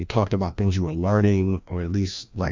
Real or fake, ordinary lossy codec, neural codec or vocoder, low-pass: fake; MP3, 64 kbps; codec, 16 kHz, 1 kbps, FreqCodec, larger model; 7.2 kHz